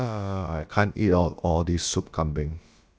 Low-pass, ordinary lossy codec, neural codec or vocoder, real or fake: none; none; codec, 16 kHz, about 1 kbps, DyCAST, with the encoder's durations; fake